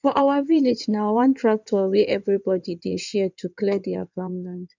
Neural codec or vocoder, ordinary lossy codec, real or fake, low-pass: codec, 16 kHz in and 24 kHz out, 2.2 kbps, FireRedTTS-2 codec; none; fake; 7.2 kHz